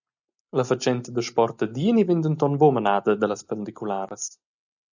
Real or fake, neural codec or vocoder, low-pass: real; none; 7.2 kHz